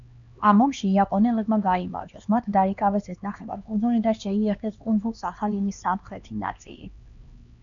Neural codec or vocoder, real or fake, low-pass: codec, 16 kHz, 2 kbps, X-Codec, HuBERT features, trained on LibriSpeech; fake; 7.2 kHz